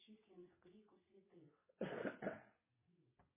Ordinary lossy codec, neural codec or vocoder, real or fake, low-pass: MP3, 16 kbps; vocoder, 22.05 kHz, 80 mel bands, WaveNeXt; fake; 3.6 kHz